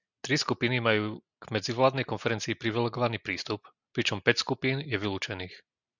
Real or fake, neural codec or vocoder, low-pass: real; none; 7.2 kHz